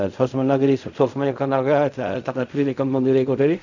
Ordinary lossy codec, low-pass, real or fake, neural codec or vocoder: none; 7.2 kHz; fake; codec, 16 kHz in and 24 kHz out, 0.4 kbps, LongCat-Audio-Codec, fine tuned four codebook decoder